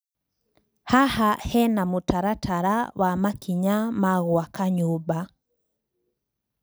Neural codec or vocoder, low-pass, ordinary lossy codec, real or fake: none; none; none; real